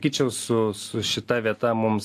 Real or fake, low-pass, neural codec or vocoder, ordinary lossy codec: real; 14.4 kHz; none; AAC, 64 kbps